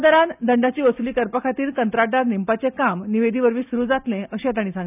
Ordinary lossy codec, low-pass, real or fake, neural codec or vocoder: none; 3.6 kHz; real; none